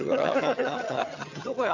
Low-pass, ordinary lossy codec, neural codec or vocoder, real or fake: 7.2 kHz; none; vocoder, 22.05 kHz, 80 mel bands, HiFi-GAN; fake